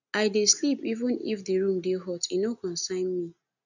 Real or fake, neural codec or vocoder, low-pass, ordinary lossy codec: real; none; 7.2 kHz; none